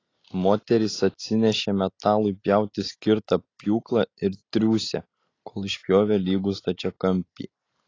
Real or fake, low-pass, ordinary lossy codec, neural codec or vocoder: real; 7.2 kHz; AAC, 32 kbps; none